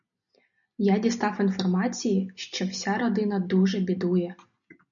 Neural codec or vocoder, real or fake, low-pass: none; real; 7.2 kHz